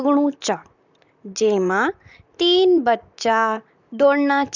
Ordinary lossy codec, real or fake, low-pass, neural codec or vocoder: none; fake; 7.2 kHz; vocoder, 44.1 kHz, 128 mel bands, Pupu-Vocoder